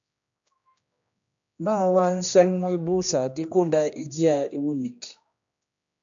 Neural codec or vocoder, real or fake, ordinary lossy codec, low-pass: codec, 16 kHz, 1 kbps, X-Codec, HuBERT features, trained on general audio; fake; AAC, 64 kbps; 7.2 kHz